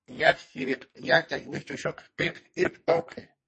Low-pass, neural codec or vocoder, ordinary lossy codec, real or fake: 10.8 kHz; codec, 44.1 kHz, 2.6 kbps, SNAC; MP3, 32 kbps; fake